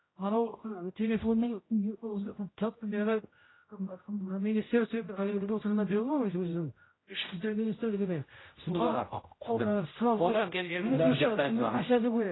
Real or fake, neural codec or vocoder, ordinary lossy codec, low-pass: fake; codec, 16 kHz, 0.5 kbps, X-Codec, HuBERT features, trained on general audio; AAC, 16 kbps; 7.2 kHz